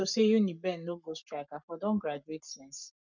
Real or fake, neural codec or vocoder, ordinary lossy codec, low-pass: fake; codec, 44.1 kHz, 7.8 kbps, Pupu-Codec; none; 7.2 kHz